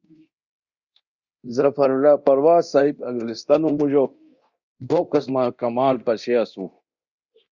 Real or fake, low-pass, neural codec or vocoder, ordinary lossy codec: fake; 7.2 kHz; codec, 24 kHz, 0.9 kbps, DualCodec; Opus, 64 kbps